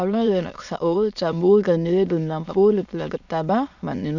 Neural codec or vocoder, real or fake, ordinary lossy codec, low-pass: autoencoder, 22.05 kHz, a latent of 192 numbers a frame, VITS, trained on many speakers; fake; none; 7.2 kHz